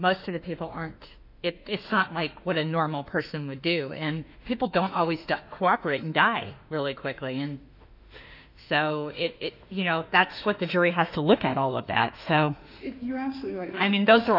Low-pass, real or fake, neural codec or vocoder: 5.4 kHz; fake; autoencoder, 48 kHz, 32 numbers a frame, DAC-VAE, trained on Japanese speech